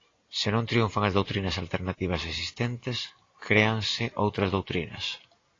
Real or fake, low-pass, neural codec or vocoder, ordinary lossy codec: real; 7.2 kHz; none; AAC, 32 kbps